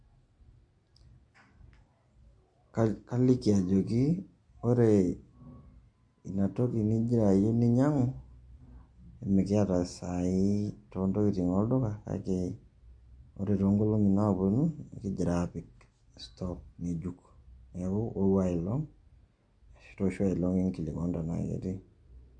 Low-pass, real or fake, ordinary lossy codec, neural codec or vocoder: 9.9 kHz; real; MP3, 48 kbps; none